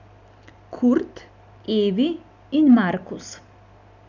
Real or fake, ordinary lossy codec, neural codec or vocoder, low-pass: real; none; none; 7.2 kHz